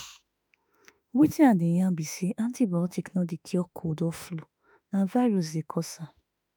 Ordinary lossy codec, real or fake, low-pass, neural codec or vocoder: none; fake; none; autoencoder, 48 kHz, 32 numbers a frame, DAC-VAE, trained on Japanese speech